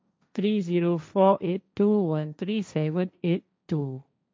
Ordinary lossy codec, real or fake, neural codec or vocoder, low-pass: none; fake; codec, 16 kHz, 1.1 kbps, Voila-Tokenizer; none